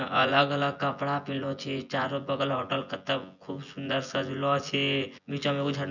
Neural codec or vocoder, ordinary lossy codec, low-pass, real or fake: vocoder, 24 kHz, 100 mel bands, Vocos; none; 7.2 kHz; fake